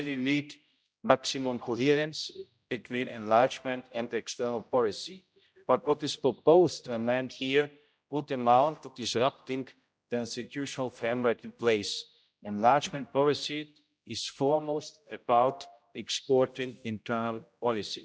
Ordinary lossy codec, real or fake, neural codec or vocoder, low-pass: none; fake; codec, 16 kHz, 0.5 kbps, X-Codec, HuBERT features, trained on general audio; none